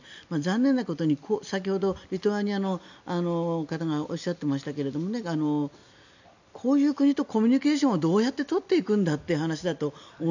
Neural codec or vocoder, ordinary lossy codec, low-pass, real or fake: none; none; 7.2 kHz; real